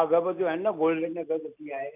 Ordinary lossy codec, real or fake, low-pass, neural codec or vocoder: none; real; 3.6 kHz; none